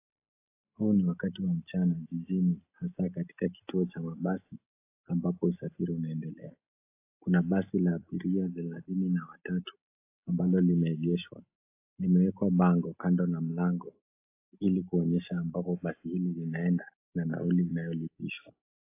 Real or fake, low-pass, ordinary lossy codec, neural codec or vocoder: real; 3.6 kHz; AAC, 24 kbps; none